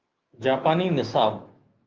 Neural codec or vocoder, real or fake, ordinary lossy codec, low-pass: autoencoder, 48 kHz, 128 numbers a frame, DAC-VAE, trained on Japanese speech; fake; Opus, 24 kbps; 7.2 kHz